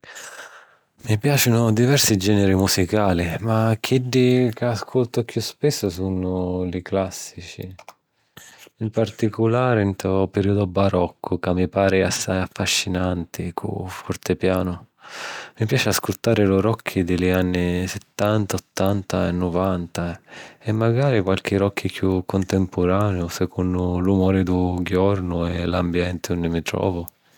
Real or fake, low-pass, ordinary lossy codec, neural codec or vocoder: real; none; none; none